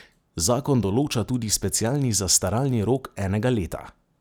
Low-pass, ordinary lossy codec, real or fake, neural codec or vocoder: none; none; real; none